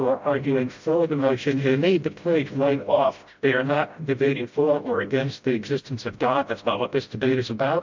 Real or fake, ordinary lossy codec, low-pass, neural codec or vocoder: fake; MP3, 48 kbps; 7.2 kHz; codec, 16 kHz, 0.5 kbps, FreqCodec, smaller model